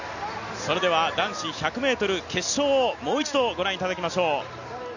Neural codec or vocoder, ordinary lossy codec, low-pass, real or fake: none; none; 7.2 kHz; real